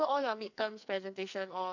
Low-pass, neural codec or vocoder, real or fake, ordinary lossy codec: 7.2 kHz; codec, 44.1 kHz, 2.6 kbps, SNAC; fake; none